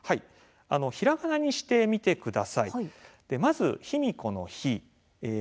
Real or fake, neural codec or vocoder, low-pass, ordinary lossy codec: real; none; none; none